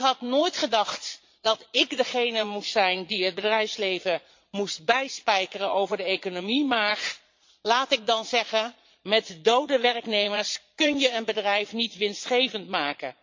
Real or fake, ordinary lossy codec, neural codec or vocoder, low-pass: fake; MP3, 48 kbps; vocoder, 22.05 kHz, 80 mel bands, Vocos; 7.2 kHz